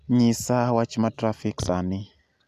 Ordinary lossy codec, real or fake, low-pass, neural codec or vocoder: none; real; 14.4 kHz; none